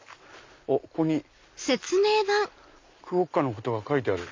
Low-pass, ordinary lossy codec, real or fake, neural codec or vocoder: 7.2 kHz; MP3, 48 kbps; real; none